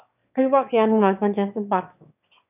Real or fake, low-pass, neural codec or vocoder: fake; 3.6 kHz; autoencoder, 22.05 kHz, a latent of 192 numbers a frame, VITS, trained on one speaker